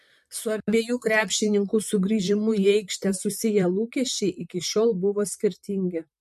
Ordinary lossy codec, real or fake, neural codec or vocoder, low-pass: MP3, 64 kbps; fake; vocoder, 44.1 kHz, 128 mel bands, Pupu-Vocoder; 14.4 kHz